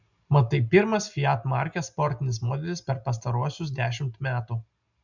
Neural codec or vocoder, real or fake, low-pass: none; real; 7.2 kHz